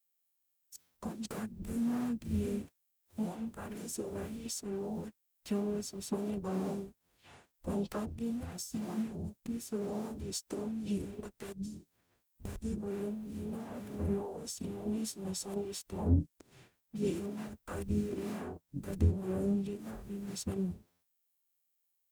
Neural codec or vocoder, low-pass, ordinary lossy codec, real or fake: codec, 44.1 kHz, 0.9 kbps, DAC; none; none; fake